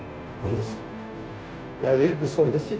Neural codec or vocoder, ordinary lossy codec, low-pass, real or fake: codec, 16 kHz, 0.5 kbps, FunCodec, trained on Chinese and English, 25 frames a second; none; none; fake